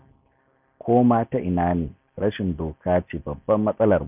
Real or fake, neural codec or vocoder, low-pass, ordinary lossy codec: real; none; 3.6 kHz; none